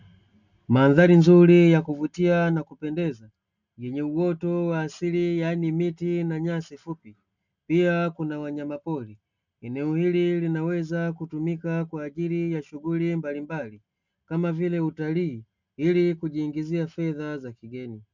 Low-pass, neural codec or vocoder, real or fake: 7.2 kHz; none; real